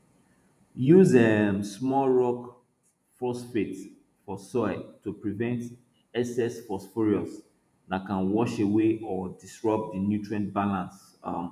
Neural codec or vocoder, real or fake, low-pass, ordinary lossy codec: vocoder, 48 kHz, 128 mel bands, Vocos; fake; 14.4 kHz; none